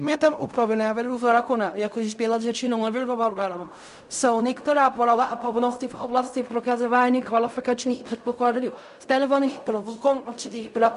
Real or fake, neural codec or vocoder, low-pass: fake; codec, 16 kHz in and 24 kHz out, 0.4 kbps, LongCat-Audio-Codec, fine tuned four codebook decoder; 10.8 kHz